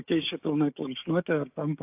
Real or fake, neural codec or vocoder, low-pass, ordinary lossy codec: fake; codec, 16 kHz, 6 kbps, DAC; 3.6 kHz; AAC, 32 kbps